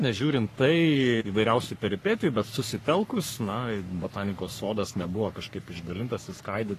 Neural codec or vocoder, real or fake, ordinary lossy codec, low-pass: codec, 44.1 kHz, 3.4 kbps, Pupu-Codec; fake; AAC, 48 kbps; 14.4 kHz